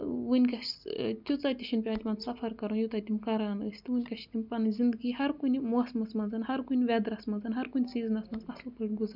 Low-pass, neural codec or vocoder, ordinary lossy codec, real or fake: 5.4 kHz; none; none; real